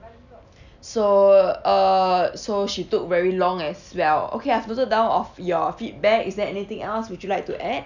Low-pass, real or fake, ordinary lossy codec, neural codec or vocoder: 7.2 kHz; real; none; none